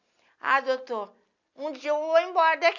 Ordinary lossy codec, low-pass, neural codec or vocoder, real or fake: none; 7.2 kHz; none; real